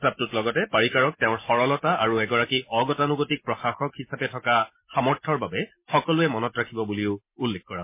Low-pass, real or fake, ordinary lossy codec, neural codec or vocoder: 3.6 kHz; real; MP3, 24 kbps; none